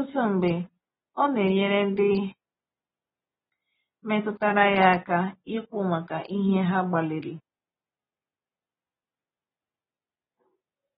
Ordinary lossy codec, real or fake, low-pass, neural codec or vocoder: AAC, 16 kbps; real; 19.8 kHz; none